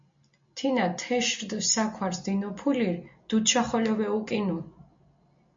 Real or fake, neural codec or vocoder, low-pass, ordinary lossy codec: real; none; 7.2 kHz; MP3, 48 kbps